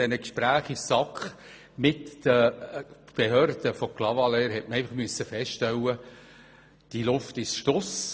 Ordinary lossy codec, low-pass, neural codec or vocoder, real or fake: none; none; none; real